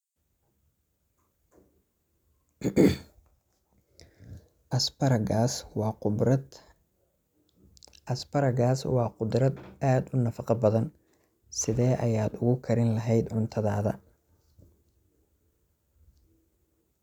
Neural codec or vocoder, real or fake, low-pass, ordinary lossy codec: none; real; 19.8 kHz; none